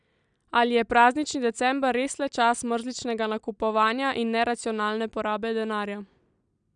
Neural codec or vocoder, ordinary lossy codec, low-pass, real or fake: none; none; 9.9 kHz; real